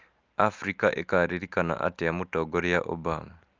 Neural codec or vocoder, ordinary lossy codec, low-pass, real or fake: none; Opus, 32 kbps; 7.2 kHz; real